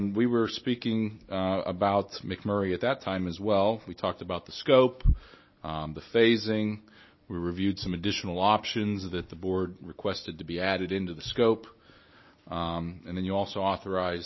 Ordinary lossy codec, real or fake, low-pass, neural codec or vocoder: MP3, 24 kbps; real; 7.2 kHz; none